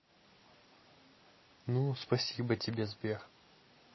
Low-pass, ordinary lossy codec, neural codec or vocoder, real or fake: 7.2 kHz; MP3, 24 kbps; none; real